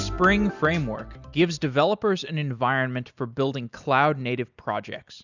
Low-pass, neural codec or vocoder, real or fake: 7.2 kHz; none; real